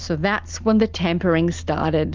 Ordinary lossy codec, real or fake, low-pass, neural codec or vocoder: Opus, 32 kbps; real; 7.2 kHz; none